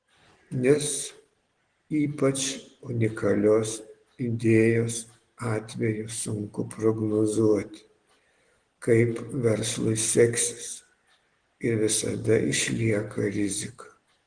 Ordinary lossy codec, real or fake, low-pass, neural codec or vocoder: Opus, 16 kbps; real; 9.9 kHz; none